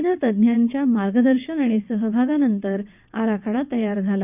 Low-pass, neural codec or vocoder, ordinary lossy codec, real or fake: 3.6 kHz; vocoder, 22.05 kHz, 80 mel bands, WaveNeXt; none; fake